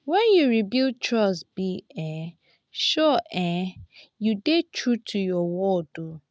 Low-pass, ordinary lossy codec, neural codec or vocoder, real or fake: none; none; none; real